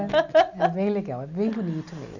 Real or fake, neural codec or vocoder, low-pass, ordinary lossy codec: real; none; 7.2 kHz; none